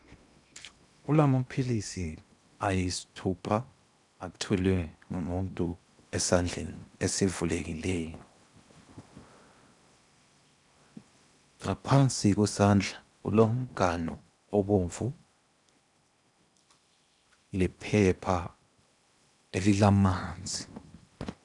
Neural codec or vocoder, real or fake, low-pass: codec, 16 kHz in and 24 kHz out, 0.8 kbps, FocalCodec, streaming, 65536 codes; fake; 10.8 kHz